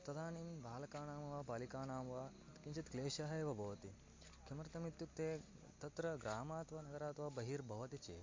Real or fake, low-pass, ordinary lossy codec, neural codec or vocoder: real; 7.2 kHz; MP3, 48 kbps; none